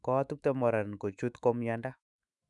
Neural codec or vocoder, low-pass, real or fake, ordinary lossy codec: autoencoder, 48 kHz, 128 numbers a frame, DAC-VAE, trained on Japanese speech; 10.8 kHz; fake; none